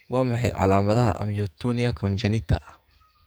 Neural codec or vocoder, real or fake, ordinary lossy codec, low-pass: codec, 44.1 kHz, 2.6 kbps, SNAC; fake; none; none